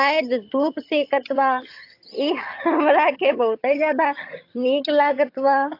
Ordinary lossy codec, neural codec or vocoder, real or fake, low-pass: AAC, 32 kbps; vocoder, 22.05 kHz, 80 mel bands, HiFi-GAN; fake; 5.4 kHz